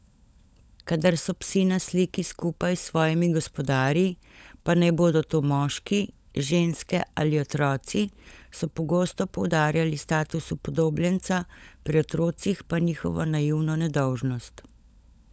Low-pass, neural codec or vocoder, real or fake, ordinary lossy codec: none; codec, 16 kHz, 16 kbps, FunCodec, trained on LibriTTS, 50 frames a second; fake; none